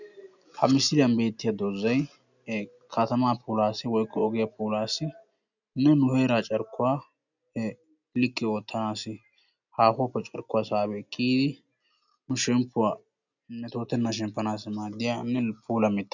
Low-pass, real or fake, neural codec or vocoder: 7.2 kHz; real; none